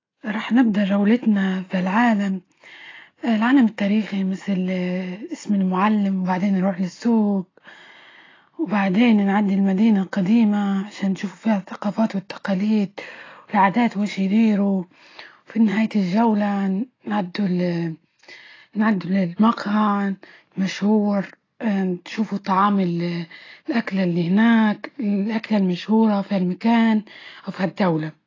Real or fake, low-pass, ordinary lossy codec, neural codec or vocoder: real; 7.2 kHz; AAC, 32 kbps; none